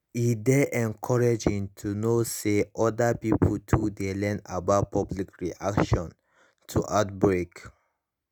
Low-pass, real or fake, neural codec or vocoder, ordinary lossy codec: none; real; none; none